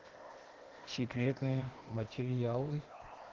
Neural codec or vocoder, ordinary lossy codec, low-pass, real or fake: codec, 16 kHz, 0.8 kbps, ZipCodec; Opus, 16 kbps; 7.2 kHz; fake